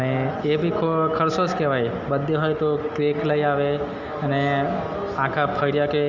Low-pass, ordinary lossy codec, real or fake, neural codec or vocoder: 7.2 kHz; Opus, 32 kbps; real; none